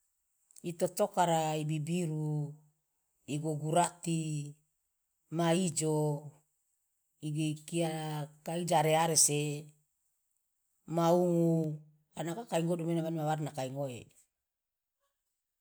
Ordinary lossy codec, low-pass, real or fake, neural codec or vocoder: none; none; real; none